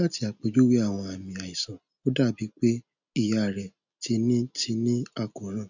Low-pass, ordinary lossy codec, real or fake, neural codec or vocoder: 7.2 kHz; none; real; none